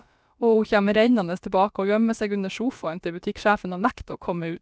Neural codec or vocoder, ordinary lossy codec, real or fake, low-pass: codec, 16 kHz, about 1 kbps, DyCAST, with the encoder's durations; none; fake; none